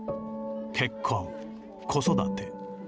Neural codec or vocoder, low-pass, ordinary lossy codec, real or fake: none; none; none; real